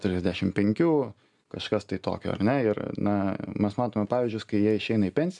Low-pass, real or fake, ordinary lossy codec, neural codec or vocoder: 10.8 kHz; real; MP3, 96 kbps; none